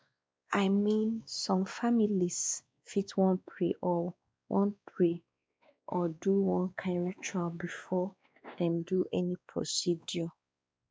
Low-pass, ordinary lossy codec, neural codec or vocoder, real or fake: none; none; codec, 16 kHz, 2 kbps, X-Codec, WavLM features, trained on Multilingual LibriSpeech; fake